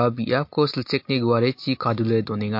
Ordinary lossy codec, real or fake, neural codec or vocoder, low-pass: MP3, 32 kbps; real; none; 5.4 kHz